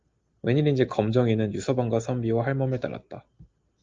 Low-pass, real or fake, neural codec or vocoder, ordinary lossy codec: 7.2 kHz; real; none; Opus, 24 kbps